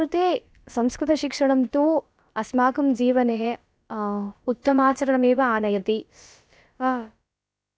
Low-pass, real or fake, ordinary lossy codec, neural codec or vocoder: none; fake; none; codec, 16 kHz, about 1 kbps, DyCAST, with the encoder's durations